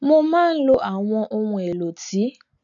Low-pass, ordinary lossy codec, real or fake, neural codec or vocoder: 7.2 kHz; none; real; none